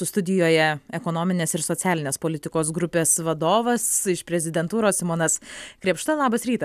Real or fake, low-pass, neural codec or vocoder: real; 14.4 kHz; none